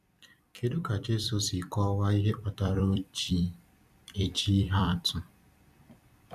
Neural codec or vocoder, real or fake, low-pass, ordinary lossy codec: vocoder, 44.1 kHz, 128 mel bands every 256 samples, BigVGAN v2; fake; 14.4 kHz; none